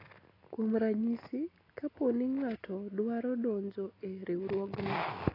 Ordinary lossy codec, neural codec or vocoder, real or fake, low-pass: AAC, 24 kbps; none; real; 5.4 kHz